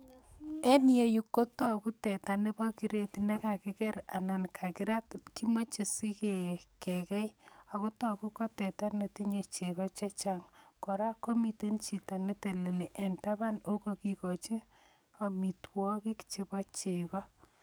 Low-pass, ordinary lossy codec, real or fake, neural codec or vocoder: none; none; fake; codec, 44.1 kHz, 7.8 kbps, DAC